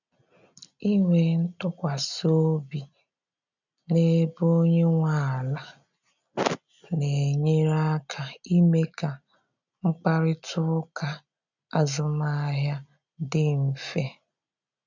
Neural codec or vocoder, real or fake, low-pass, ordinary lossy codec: none; real; 7.2 kHz; none